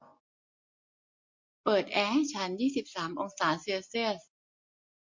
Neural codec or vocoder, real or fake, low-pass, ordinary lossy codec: none; real; 7.2 kHz; MP3, 48 kbps